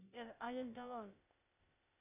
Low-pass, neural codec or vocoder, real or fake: 3.6 kHz; codec, 16 kHz, 0.8 kbps, ZipCodec; fake